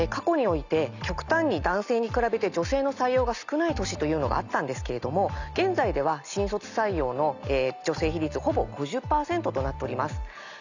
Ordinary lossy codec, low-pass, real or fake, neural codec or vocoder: none; 7.2 kHz; real; none